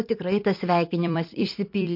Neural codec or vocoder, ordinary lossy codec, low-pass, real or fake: vocoder, 44.1 kHz, 128 mel bands every 256 samples, BigVGAN v2; MP3, 32 kbps; 5.4 kHz; fake